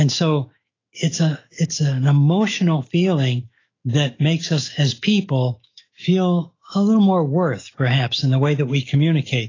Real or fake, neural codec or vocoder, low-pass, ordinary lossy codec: fake; autoencoder, 48 kHz, 128 numbers a frame, DAC-VAE, trained on Japanese speech; 7.2 kHz; AAC, 32 kbps